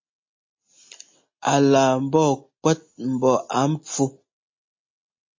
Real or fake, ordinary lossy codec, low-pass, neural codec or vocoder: real; MP3, 32 kbps; 7.2 kHz; none